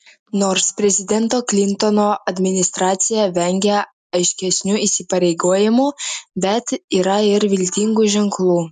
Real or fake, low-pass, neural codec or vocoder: real; 10.8 kHz; none